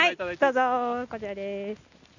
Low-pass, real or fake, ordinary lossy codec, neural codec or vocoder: 7.2 kHz; real; none; none